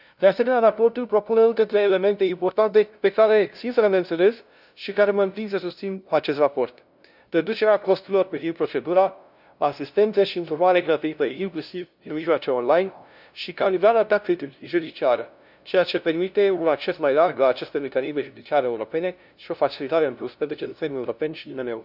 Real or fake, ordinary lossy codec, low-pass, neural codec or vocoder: fake; none; 5.4 kHz; codec, 16 kHz, 0.5 kbps, FunCodec, trained on LibriTTS, 25 frames a second